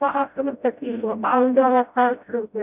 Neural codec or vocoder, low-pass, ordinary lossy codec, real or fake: codec, 16 kHz, 0.5 kbps, FreqCodec, smaller model; 3.6 kHz; none; fake